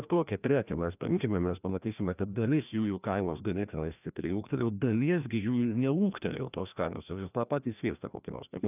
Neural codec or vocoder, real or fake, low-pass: codec, 16 kHz, 1 kbps, FreqCodec, larger model; fake; 3.6 kHz